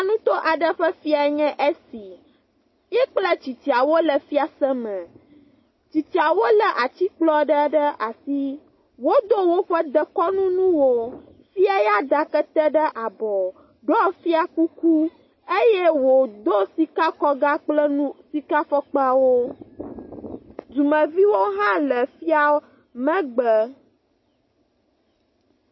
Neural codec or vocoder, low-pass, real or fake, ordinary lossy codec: none; 7.2 kHz; real; MP3, 24 kbps